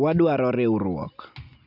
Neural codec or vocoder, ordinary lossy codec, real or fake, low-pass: none; none; real; 5.4 kHz